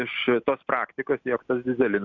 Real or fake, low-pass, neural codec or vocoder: real; 7.2 kHz; none